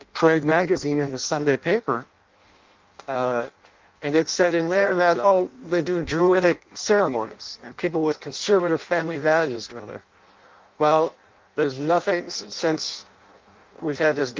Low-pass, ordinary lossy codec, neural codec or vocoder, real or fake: 7.2 kHz; Opus, 24 kbps; codec, 16 kHz in and 24 kHz out, 0.6 kbps, FireRedTTS-2 codec; fake